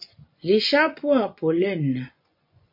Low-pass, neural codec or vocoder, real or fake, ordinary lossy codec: 5.4 kHz; vocoder, 24 kHz, 100 mel bands, Vocos; fake; MP3, 32 kbps